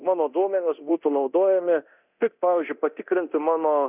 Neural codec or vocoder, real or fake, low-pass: codec, 24 kHz, 0.9 kbps, DualCodec; fake; 3.6 kHz